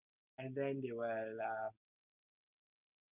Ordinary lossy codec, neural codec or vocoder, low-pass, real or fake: none; none; 3.6 kHz; real